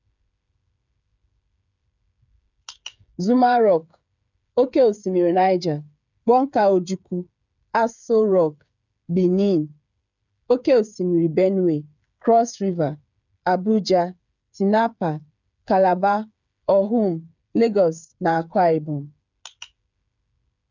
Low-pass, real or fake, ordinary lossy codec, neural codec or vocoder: 7.2 kHz; fake; none; codec, 16 kHz, 8 kbps, FreqCodec, smaller model